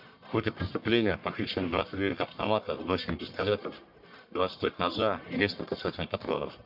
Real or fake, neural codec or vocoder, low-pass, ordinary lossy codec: fake; codec, 44.1 kHz, 1.7 kbps, Pupu-Codec; 5.4 kHz; MP3, 48 kbps